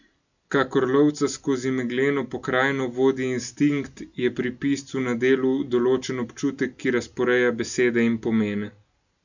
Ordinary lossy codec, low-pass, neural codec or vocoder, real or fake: none; 7.2 kHz; none; real